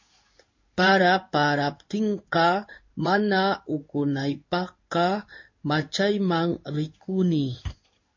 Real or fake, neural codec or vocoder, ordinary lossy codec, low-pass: fake; codec, 16 kHz in and 24 kHz out, 1 kbps, XY-Tokenizer; MP3, 48 kbps; 7.2 kHz